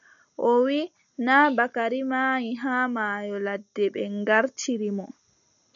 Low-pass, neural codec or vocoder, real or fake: 7.2 kHz; none; real